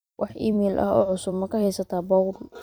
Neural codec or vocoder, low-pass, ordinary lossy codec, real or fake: vocoder, 44.1 kHz, 128 mel bands every 256 samples, BigVGAN v2; none; none; fake